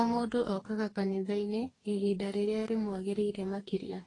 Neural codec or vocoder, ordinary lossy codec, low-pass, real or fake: codec, 44.1 kHz, 2.6 kbps, DAC; AAC, 32 kbps; 10.8 kHz; fake